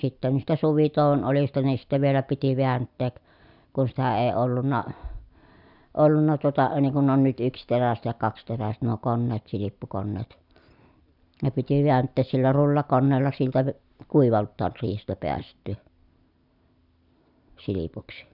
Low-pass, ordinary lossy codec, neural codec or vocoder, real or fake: 5.4 kHz; none; none; real